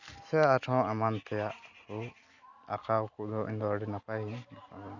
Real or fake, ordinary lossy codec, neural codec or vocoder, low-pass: real; none; none; 7.2 kHz